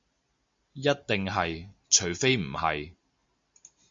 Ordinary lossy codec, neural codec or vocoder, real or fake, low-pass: MP3, 48 kbps; none; real; 7.2 kHz